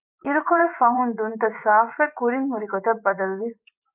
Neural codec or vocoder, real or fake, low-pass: vocoder, 24 kHz, 100 mel bands, Vocos; fake; 3.6 kHz